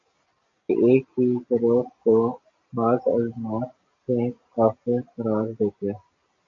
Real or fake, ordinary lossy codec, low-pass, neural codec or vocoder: real; AAC, 64 kbps; 7.2 kHz; none